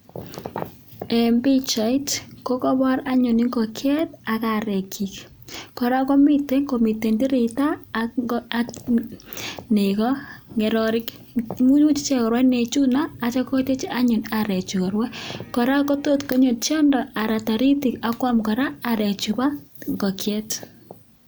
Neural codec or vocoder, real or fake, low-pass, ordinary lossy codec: none; real; none; none